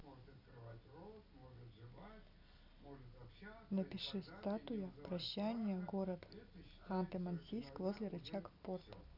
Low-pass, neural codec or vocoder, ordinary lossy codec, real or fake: 5.4 kHz; none; none; real